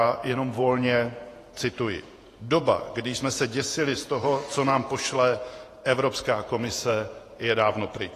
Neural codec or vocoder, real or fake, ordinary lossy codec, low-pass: vocoder, 48 kHz, 128 mel bands, Vocos; fake; AAC, 48 kbps; 14.4 kHz